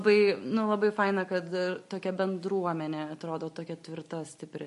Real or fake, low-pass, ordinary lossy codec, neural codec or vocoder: real; 14.4 kHz; MP3, 48 kbps; none